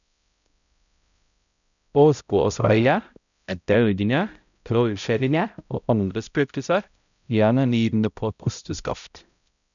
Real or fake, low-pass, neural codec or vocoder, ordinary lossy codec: fake; 7.2 kHz; codec, 16 kHz, 0.5 kbps, X-Codec, HuBERT features, trained on balanced general audio; none